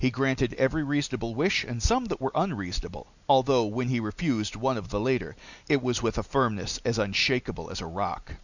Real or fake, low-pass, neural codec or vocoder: real; 7.2 kHz; none